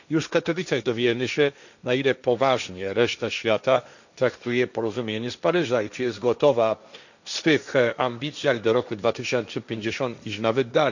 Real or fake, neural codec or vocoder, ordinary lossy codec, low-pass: fake; codec, 16 kHz, 1.1 kbps, Voila-Tokenizer; none; 7.2 kHz